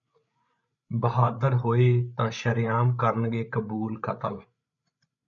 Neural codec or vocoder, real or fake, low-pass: codec, 16 kHz, 8 kbps, FreqCodec, larger model; fake; 7.2 kHz